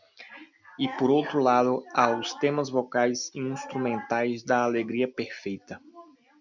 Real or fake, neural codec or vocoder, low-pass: real; none; 7.2 kHz